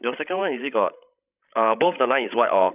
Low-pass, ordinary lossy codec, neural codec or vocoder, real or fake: 3.6 kHz; none; codec, 16 kHz, 16 kbps, FreqCodec, larger model; fake